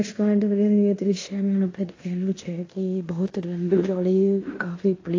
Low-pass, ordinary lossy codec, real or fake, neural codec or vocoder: 7.2 kHz; AAC, 32 kbps; fake; codec, 16 kHz in and 24 kHz out, 0.9 kbps, LongCat-Audio-Codec, fine tuned four codebook decoder